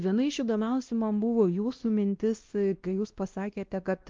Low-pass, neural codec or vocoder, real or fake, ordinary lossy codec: 7.2 kHz; codec, 16 kHz, 1 kbps, X-Codec, WavLM features, trained on Multilingual LibriSpeech; fake; Opus, 32 kbps